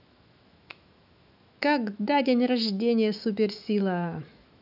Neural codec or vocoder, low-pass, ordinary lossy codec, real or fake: autoencoder, 48 kHz, 128 numbers a frame, DAC-VAE, trained on Japanese speech; 5.4 kHz; none; fake